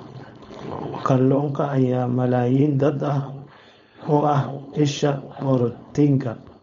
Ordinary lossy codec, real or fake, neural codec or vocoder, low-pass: MP3, 48 kbps; fake; codec, 16 kHz, 4.8 kbps, FACodec; 7.2 kHz